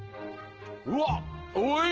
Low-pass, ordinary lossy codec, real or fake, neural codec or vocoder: 7.2 kHz; Opus, 16 kbps; real; none